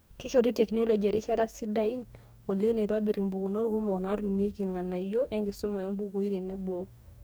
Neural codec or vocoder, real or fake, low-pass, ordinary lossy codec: codec, 44.1 kHz, 2.6 kbps, DAC; fake; none; none